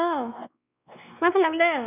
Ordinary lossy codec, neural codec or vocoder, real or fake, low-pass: none; codec, 16 kHz, 2 kbps, X-Codec, WavLM features, trained on Multilingual LibriSpeech; fake; 3.6 kHz